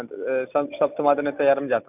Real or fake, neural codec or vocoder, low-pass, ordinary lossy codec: real; none; 3.6 kHz; none